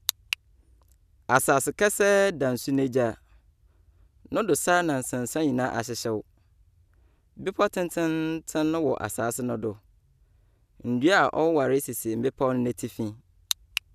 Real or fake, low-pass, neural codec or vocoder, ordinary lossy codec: real; 14.4 kHz; none; none